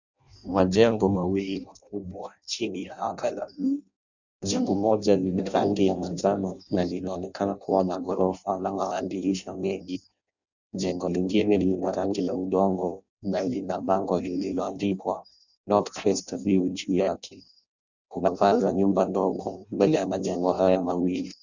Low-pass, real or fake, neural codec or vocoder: 7.2 kHz; fake; codec, 16 kHz in and 24 kHz out, 0.6 kbps, FireRedTTS-2 codec